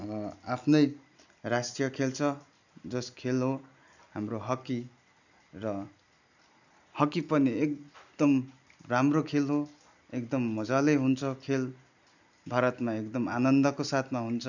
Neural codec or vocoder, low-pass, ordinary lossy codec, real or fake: none; 7.2 kHz; none; real